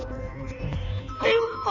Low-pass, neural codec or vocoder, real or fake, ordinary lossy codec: 7.2 kHz; codec, 16 kHz in and 24 kHz out, 1.1 kbps, FireRedTTS-2 codec; fake; none